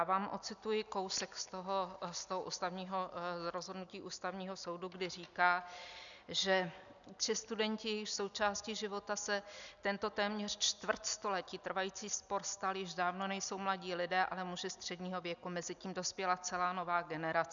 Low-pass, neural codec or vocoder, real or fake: 7.2 kHz; none; real